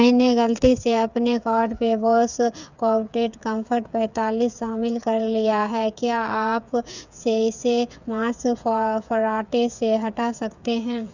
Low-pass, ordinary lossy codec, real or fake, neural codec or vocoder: 7.2 kHz; none; fake; codec, 16 kHz, 8 kbps, FreqCodec, smaller model